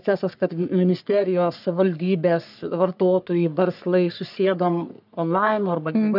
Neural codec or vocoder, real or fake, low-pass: codec, 44.1 kHz, 3.4 kbps, Pupu-Codec; fake; 5.4 kHz